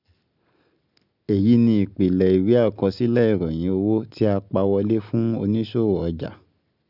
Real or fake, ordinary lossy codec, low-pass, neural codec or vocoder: real; none; 5.4 kHz; none